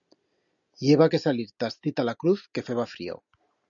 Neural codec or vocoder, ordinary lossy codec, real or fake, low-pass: none; MP3, 96 kbps; real; 7.2 kHz